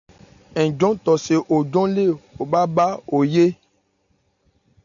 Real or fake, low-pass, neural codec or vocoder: real; 7.2 kHz; none